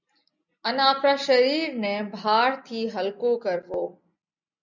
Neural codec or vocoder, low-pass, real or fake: none; 7.2 kHz; real